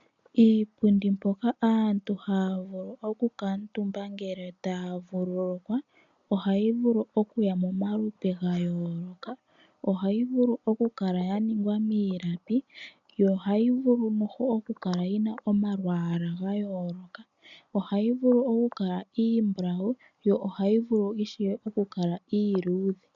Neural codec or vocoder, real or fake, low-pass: none; real; 7.2 kHz